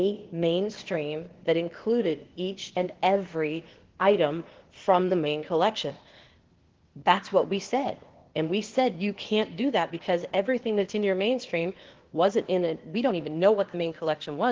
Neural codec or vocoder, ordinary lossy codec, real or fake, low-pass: codec, 16 kHz, 0.8 kbps, ZipCodec; Opus, 16 kbps; fake; 7.2 kHz